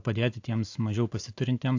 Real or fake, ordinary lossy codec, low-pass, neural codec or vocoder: real; AAC, 48 kbps; 7.2 kHz; none